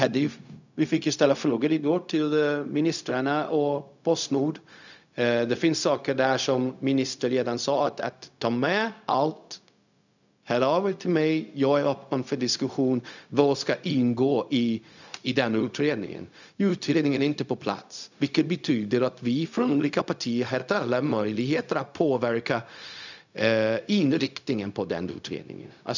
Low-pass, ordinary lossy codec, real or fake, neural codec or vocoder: 7.2 kHz; none; fake; codec, 16 kHz, 0.4 kbps, LongCat-Audio-Codec